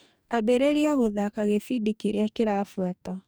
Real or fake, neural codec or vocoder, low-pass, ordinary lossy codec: fake; codec, 44.1 kHz, 2.6 kbps, DAC; none; none